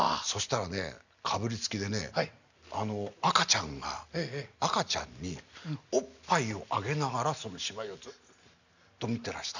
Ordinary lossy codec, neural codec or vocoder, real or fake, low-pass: none; none; real; 7.2 kHz